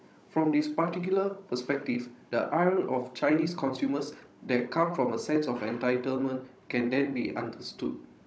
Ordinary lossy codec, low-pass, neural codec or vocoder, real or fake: none; none; codec, 16 kHz, 16 kbps, FunCodec, trained on Chinese and English, 50 frames a second; fake